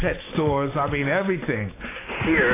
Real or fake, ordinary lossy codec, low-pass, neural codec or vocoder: fake; AAC, 16 kbps; 3.6 kHz; codec, 16 kHz, 6 kbps, DAC